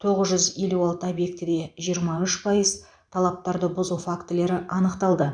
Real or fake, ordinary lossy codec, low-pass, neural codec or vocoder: fake; none; 9.9 kHz; vocoder, 44.1 kHz, 128 mel bands every 512 samples, BigVGAN v2